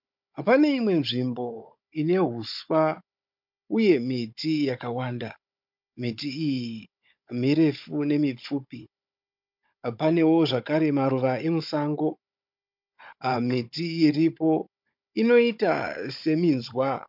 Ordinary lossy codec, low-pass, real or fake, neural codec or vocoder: MP3, 48 kbps; 5.4 kHz; fake; codec, 16 kHz, 16 kbps, FunCodec, trained on Chinese and English, 50 frames a second